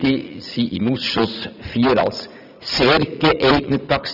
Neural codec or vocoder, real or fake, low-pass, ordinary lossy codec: vocoder, 44.1 kHz, 80 mel bands, Vocos; fake; 5.4 kHz; none